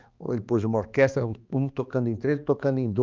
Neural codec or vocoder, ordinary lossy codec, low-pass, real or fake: codec, 16 kHz, 2 kbps, X-Codec, HuBERT features, trained on balanced general audio; Opus, 24 kbps; 7.2 kHz; fake